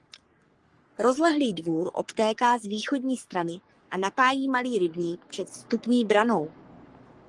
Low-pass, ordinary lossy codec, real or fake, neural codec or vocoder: 10.8 kHz; Opus, 24 kbps; fake; codec, 44.1 kHz, 3.4 kbps, Pupu-Codec